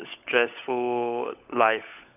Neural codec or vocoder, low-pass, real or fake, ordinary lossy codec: codec, 16 kHz, 16 kbps, FunCodec, trained on LibriTTS, 50 frames a second; 3.6 kHz; fake; none